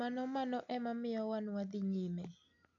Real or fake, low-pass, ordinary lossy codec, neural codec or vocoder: real; 7.2 kHz; none; none